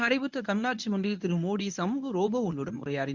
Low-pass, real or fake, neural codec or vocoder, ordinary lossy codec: 7.2 kHz; fake; codec, 24 kHz, 0.9 kbps, WavTokenizer, medium speech release version 1; none